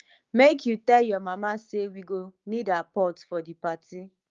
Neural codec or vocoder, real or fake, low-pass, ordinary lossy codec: codec, 16 kHz, 6 kbps, DAC; fake; 7.2 kHz; Opus, 32 kbps